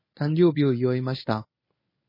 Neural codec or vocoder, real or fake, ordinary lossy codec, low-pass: codec, 24 kHz, 0.9 kbps, WavTokenizer, medium speech release version 1; fake; MP3, 24 kbps; 5.4 kHz